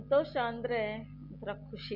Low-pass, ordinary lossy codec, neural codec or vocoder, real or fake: 5.4 kHz; none; none; real